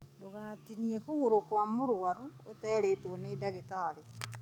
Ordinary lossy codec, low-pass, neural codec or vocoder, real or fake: none; 19.8 kHz; codec, 44.1 kHz, 7.8 kbps, DAC; fake